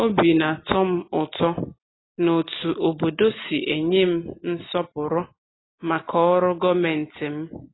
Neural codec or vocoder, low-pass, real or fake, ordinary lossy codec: none; 7.2 kHz; real; AAC, 16 kbps